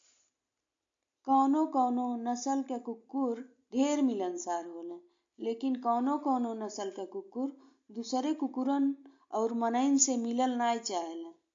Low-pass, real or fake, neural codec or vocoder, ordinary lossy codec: 7.2 kHz; real; none; MP3, 48 kbps